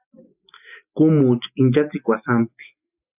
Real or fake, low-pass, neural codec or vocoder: real; 3.6 kHz; none